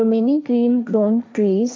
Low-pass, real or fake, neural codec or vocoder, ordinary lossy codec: none; fake; codec, 16 kHz, 1.1 kbps, Voila-Tokenizer; none